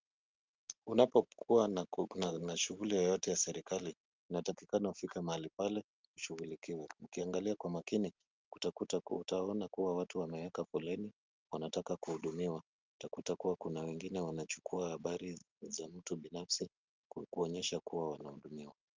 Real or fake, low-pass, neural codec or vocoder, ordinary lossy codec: real; 7.2 kHz; none; Opus, 24 kbps